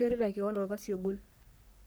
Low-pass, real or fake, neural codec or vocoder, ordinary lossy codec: none; fake; codec, 44.1 kHz, 3.4 kbps, Pupu-Codec; none